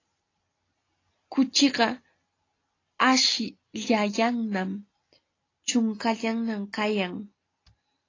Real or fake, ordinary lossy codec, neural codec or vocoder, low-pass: real; AAC, 32 kbps; none; 7.2 kHz